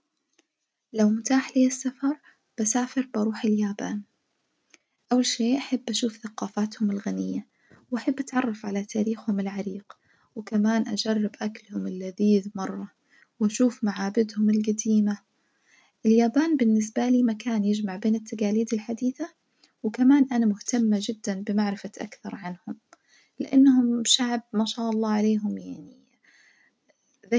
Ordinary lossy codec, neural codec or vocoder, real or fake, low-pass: none; none; real; none